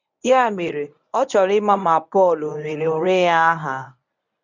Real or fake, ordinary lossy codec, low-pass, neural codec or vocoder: fake; none; 7.2 kHz; codec, 24 kHz, 0.9 kbps, WavTokenizer, medium speech release version 1